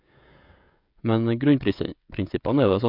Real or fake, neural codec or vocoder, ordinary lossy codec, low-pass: fake; codec, 16 kHz, 16 kbps, FreqCodec, smaller model; MP3, 48 kbps; 5.4 kHz